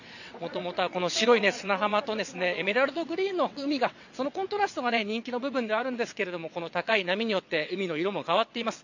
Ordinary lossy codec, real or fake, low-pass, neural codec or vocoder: none; fake; 7.2 kHz; vocoder, 22.05 kHz, 80 mel bands, WaveNeXt